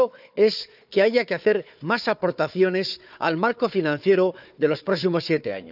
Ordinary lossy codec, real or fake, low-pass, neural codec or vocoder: none; fake; 5.4 kHz; codec, 16 kHz, 4 kbps, FunCodec, trained on Chinese and English, 50 frames a second